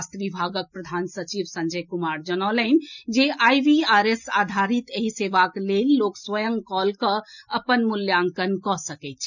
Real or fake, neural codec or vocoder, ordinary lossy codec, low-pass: real; none; none; 7.2 kHz